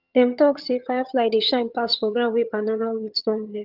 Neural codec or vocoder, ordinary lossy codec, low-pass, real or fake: vocoder, 22.05 kHz, 80 mel bands, HiFi-GAN; Opus, 32 kbps; 5.4 kHz; fake